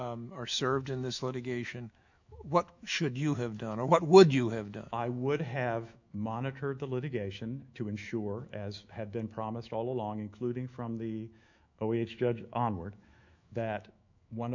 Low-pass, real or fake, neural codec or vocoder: 7.2 kHz; fake; autoencoder, 48 kHz, 128 numbers a frame, DAC-VAE, trained on Japanese speech